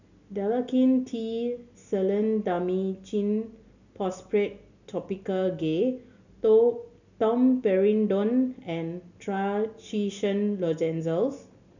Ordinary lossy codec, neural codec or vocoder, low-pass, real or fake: none; none; 7.2 kHz; real